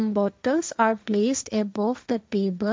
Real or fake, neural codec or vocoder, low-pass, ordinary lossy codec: fake; codec, 16 kHz, 1.1 kbps, Voila-Tokenizer; none; none